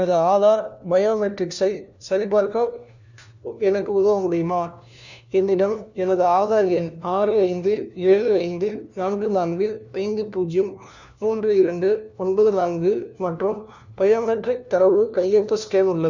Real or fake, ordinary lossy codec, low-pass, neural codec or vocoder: fake; none; 7.2 kHz; codec, 16 kHz, 1 kbps, FunCodec, trained on LibriTTS, 50 frames a second